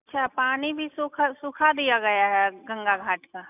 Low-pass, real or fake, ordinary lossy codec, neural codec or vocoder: 3.6 kHz; real; none; none